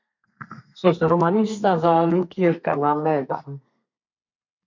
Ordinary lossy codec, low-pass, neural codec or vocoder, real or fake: MP3, 48 kbps; 7.2 kHz; codec, 32 kHz, 1.9 kbps, SNAC; fake